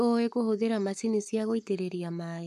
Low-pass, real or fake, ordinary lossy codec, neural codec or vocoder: 14.4 kHz; fake; none; codec, 44.1 kHz, 7.8 kbps, Pupu-Codec